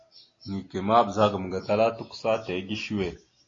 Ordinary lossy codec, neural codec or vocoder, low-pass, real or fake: AAC, 32 kbps; none; 7.2 kHz; real